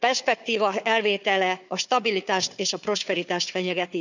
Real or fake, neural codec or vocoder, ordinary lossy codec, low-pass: fake; codec, 16 kHz, 4 kbps, FunCodec, trained on Chinese and English, 50 frames a second; none; 7.2 kHz